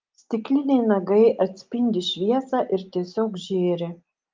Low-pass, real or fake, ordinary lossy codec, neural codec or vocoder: 7.2 kHz; real; Opus, 24 kbps; none